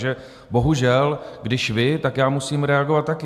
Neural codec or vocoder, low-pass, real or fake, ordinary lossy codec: none; 14.4 kHz; real; AAC, 96 kbps